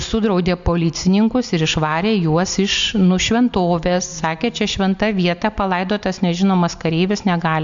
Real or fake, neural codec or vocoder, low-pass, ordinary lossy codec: real; none; 7.2 kHz; MP3, 64 kbps